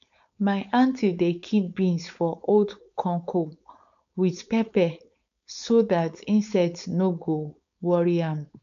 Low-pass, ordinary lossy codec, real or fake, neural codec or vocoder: 7.2 kHz; none; fake; codec, 16 kHz, 4.8 kbps, FACodec